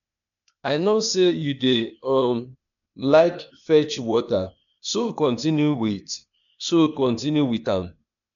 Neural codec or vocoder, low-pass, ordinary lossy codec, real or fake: codec, 16 kHz, 0.8 kbps, ZipCodec; 7.2 kHz; none; fake